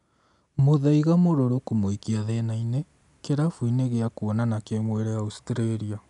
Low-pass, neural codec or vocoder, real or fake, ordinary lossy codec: 10.8 kHz; none; real; none